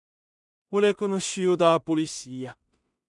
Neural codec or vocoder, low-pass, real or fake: codec, 16 kHz in and 24 kHz out, 0.4 kbps, LongCat-Audio-Codec, two codebook decoder; 10.8 kHz; fake